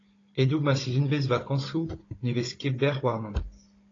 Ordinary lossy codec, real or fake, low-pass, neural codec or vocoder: AAC, 32 kbps; fake; 7.2 kHz; codec, 16 kHz, 16 kbps, FunCodec, trained on Chinese and English, 50 frames a second